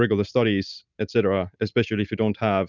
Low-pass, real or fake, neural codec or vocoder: 7.2 kHz; real; none